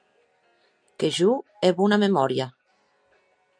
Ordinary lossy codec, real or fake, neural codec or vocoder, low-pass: MP3, 96 kbps; real; none; 9.9 kHz